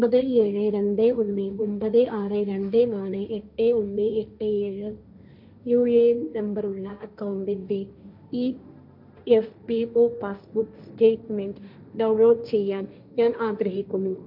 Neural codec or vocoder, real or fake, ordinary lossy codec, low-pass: codec, 16 kHz, 1.1 kbps, Voila-Tokenizer; fake; none; 5.4 kHz